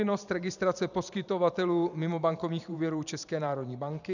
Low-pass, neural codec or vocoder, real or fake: 7.2 kHz; vocoder, 44.1 kHz, 128 mel bands every 512 samples, BigVGAN v2; fake